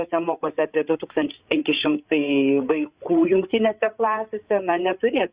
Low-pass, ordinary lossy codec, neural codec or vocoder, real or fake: 3.6 kHz; Opus, 64 kbps; codec, 16 kHz, 8 kbps, FreqCodec, larger model; fake